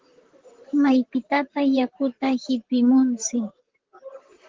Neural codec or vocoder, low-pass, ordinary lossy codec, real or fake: vocoder, 44.1 kHz, 128 mel bands, Pupu-Vocoder; 7.2 kHz; Opus, 16 kbps; fake